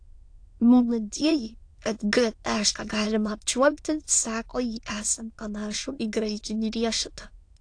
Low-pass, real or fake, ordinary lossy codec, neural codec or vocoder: 9.9 kHz; fake; AAC, 48 kbps; autoencoder, 22.05 kHz, a latent of 192 numbers a frame, VITS, trained on many speakers